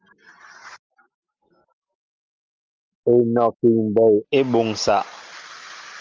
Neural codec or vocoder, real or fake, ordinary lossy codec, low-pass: none; real; Opus, 24 kbps; 7.2 kHz